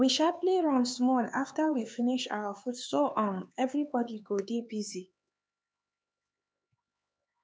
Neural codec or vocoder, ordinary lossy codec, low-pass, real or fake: codec, 16 kHz, 4 kbps, X-Codec, HuBERT features, trained on LibriSpeech; none; none; fake